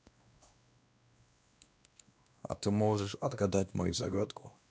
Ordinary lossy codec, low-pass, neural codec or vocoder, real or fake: none; none; codec, 16 kHz, 1 kbps, X-Codec, WavLM features, trained on Multilingual LibriSpeech; fake